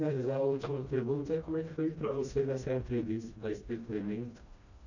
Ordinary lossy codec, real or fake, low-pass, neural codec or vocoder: none; fake; 7.2 kHz; codec, 16 kHz, 1 kbps, FreqCodec, smaller model